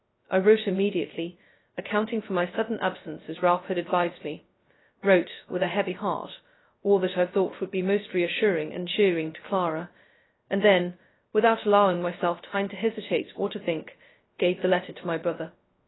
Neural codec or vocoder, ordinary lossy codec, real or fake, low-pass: codec, 16 kHz, 0.2 kbps, FocalCodec; AAC, 16 kbps; fake; 7.2 kHz